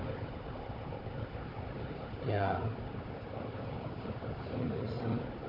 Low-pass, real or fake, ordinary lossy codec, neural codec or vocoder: 5.4 kHz; fake; AAC, 32 kbps; codec, 16 kHz, 4 kbps, FunCodec, trained on Chinese and English, 50 frames a second